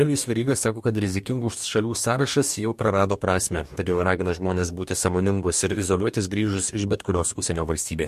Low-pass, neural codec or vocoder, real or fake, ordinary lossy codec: 14.4 kHz; codec, 44.1 kHz, 2.6 kbps, DAC; fake; MP3, 64 kbps